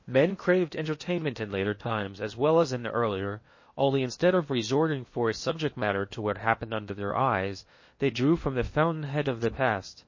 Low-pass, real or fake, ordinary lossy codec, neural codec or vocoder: 7.2 kHz; fake; MP3, 32 kbps; codec, 16 kHz in and 24 kHz out, 0.8 kbps, FocalCodec, streaming, 65536 codes